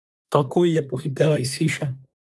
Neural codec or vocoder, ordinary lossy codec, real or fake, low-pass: codec, 24 kHz, 1 kbps, SNAC; none; fake; none